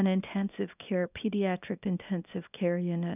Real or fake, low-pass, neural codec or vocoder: fake; 3.6 kHz; codec, 24 kHz, 0.9 kbps, WavTokenizer, small release